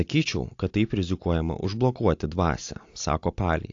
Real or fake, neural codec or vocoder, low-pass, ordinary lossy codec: real; none; 7.2 kHz; AAC, 48 kbps